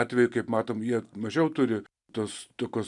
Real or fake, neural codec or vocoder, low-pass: real; none; 10.8 kHz